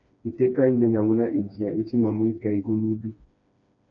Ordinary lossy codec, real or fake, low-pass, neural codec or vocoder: MP3, 48 kbps; fake; 7.2 kHz; codec, 16 kHz, 2 kbps, FreqCodec, smaller model